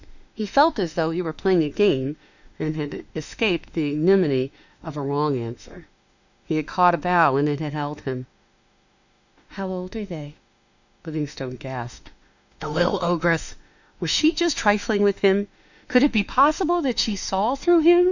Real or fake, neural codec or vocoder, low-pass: fake; autoencoder, 48 kHz, 32 numbers a frame, DAC-VAE, trained on Japanese speech; 7.2 kHz